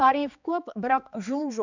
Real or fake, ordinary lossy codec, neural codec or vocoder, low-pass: fake; none; codec, 16 kHz, 2 kbps, X-Codec, HuBERT features, trained on balanced general audio; 7.2 kHz